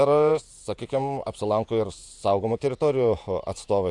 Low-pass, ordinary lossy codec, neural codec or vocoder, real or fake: 10.8 kHz; AAC, 64 kbps; vocoder, 48 kHz, 128 mel bands, Vocos; fake